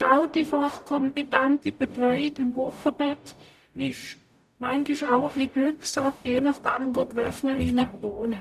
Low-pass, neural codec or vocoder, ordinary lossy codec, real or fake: 14.4 kHz; codec, 44.1 kHz, 0.9 kbps, DAC; none; fake